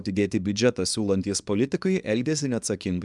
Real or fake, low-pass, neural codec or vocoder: fake; 10.8 kHz; codec, 24 kHz, 0.9 kbps, WavTokenizer, small release